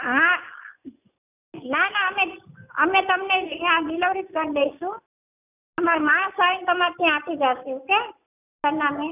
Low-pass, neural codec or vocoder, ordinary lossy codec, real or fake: 3.6 kHz; none; none; real